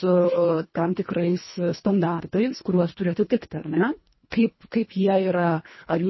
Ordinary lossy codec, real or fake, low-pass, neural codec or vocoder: MP3, 24 kbps; fake; 7.2 kHz; codec, 24 kHz, 1.5 kbps, HILCodec